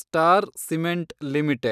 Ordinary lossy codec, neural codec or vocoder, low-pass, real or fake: none; none; 14.4 kHz; real